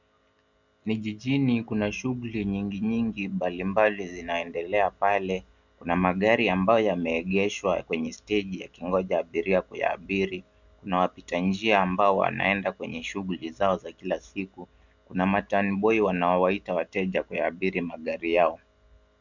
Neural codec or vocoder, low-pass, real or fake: none; 7.2 kHz; real